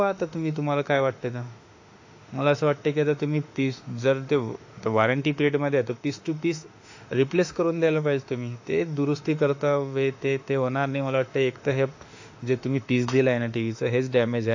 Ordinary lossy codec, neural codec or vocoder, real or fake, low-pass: AAC, 48 kbps; autoencoder, 48 kHz, 32 numbers a frame, DAC-VAE, trained on Japanese speech; fake; 7.2 kHz